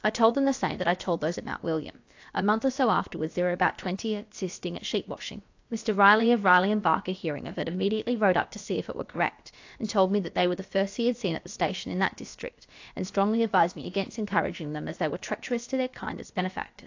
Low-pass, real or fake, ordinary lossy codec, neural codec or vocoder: 7.2 kHz; fake; AAC, 48 kbps; codec, 16 kHz, about 1 kbps, DyCAST, with the encoder's durations